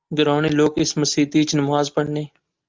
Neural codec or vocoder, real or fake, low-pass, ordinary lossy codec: none; real; 7.2 kHz; Opus, 24 kbps